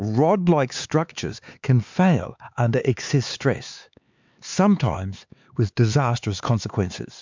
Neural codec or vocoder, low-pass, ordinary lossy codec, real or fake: codec, 16 kHz, 4 kbps, X-Codec, HuBERT features, trained on LibriSpeech; 7.2 kHz; MP3, 64 kbps; fake